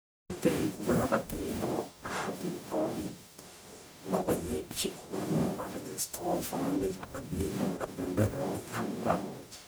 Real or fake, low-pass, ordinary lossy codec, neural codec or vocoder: fake; none; none; codec, 44.1 kHz, 0.9 kbps, DAC